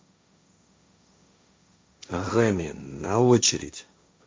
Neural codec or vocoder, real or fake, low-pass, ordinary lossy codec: codec, 16 kHz, 1.1 kbps, Voila-Tokenizer; fake; none; none